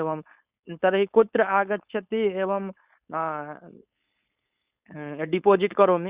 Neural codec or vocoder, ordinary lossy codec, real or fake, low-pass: codec, 16 kHz, 4.8 kbps, FACodec; Opus, 64 kbps; fake; 3.6 kHz